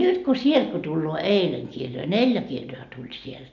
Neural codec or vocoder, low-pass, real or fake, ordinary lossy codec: none; 7.2 kHz; real; none